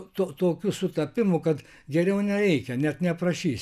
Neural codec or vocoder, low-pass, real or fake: none; 14.4 kHz; real